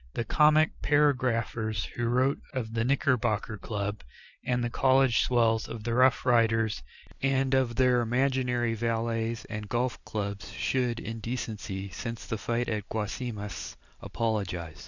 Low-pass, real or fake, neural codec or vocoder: 7.2 kHz; real; none